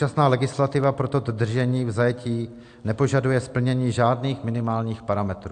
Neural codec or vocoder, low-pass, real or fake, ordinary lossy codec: none; 9.9 kHz; real; AAC, 64 kbps